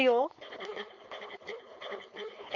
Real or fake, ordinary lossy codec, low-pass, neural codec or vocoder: fake; AAC, 48 kbps; 7.2 kHz; codec, 16 kHz, 4.8 kbps, FACodec